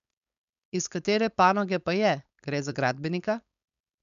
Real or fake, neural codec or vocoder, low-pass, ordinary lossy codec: fake; codec, 16 kHz, 4.8 kbps, FACodec; 7.2 kHz; none